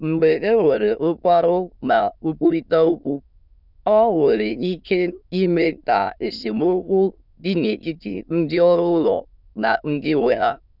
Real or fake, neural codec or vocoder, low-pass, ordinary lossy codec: fake; autoencoder, 22.05 kHz, a latent of 192 numbers a frame, VITS, trained on many speakers; 5.4 kHz; none